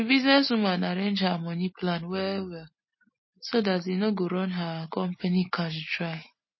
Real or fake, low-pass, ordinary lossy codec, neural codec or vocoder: real; 7.2 kHz; MP3, 24 kbps; none